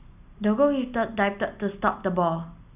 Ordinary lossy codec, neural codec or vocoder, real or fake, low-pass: none; none; real; 3.6 kHz